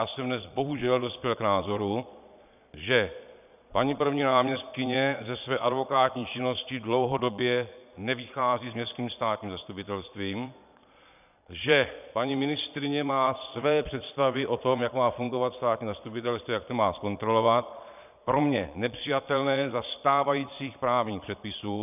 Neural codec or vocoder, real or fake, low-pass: vocoder, 22.05 kHz, 80 mel bands, Vocos; fake; 3.6 kHz